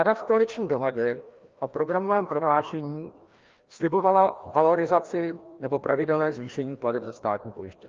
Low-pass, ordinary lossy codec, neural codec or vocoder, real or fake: 7.2 kHz; Opus, 24 kbps; codec, 16 kHz, 1 kbps, FreqCodec, larger model; fake